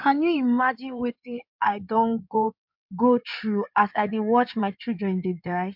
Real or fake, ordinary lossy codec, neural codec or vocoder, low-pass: fake; MP3, 48 kbps; codec, 16 kHz in and 24 kHz out, 2.2 kbps, FireRedTTS-2 codec; 5.4 kHz